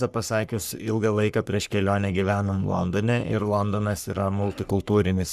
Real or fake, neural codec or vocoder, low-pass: fake; codec, 44.1 kHz, 3.4 kbps, Pupu-Codec; 14.4 kHz